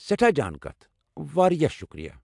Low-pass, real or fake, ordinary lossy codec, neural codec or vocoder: 10.8 kHz; real; AAC, 64 kbps; none